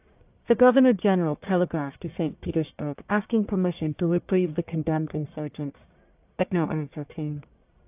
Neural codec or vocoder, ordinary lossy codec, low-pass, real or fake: codec, 44.1 kHz, 1.7 kbps, Pupu-Codec; AAC, 32 kbps; 3.6 kHz; fake